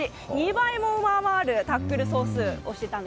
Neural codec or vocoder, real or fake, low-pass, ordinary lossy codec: none; real; none; none